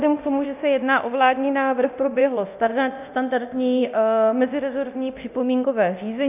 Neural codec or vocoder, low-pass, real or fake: codec, 24 kHz, 0.9 kbps, DualCodec; 3.6 kHz; fake